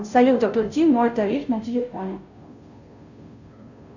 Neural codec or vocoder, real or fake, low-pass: codec, 16 kHz, 0.5 kbps, FunCodec, trained on Chinese and English, 25 frames a second; fake; 7.2 kHz